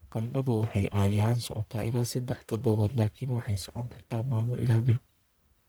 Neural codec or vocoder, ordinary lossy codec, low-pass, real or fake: codec, 44.1 kHz, 1.7 kbps, Pupu-Codec; none; none; fake